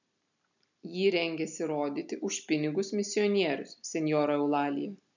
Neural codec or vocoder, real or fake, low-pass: none; real; 7.2 kHz